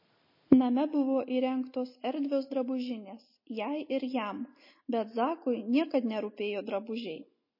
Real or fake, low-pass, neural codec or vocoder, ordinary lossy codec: real; 5.4 kHz; none; MP3, 24 kbps